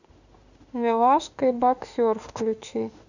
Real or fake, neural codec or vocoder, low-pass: fake; autoencoder, 48 kHz, 32 numbers a frame, DAC-VAE, trained on Japanese speech; 7.2 kHz